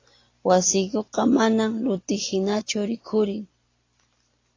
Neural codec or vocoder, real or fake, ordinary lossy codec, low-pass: none; real; AAC, 32 kbps; 7.2 kHz